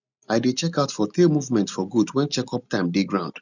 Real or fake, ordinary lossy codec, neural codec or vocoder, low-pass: real; none; none; 7.2 kHz